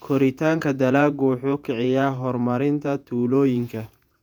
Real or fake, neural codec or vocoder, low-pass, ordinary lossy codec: fake; vocoder, 48 kHz, 128 mel bands, Vocos; 19.8 kHz; none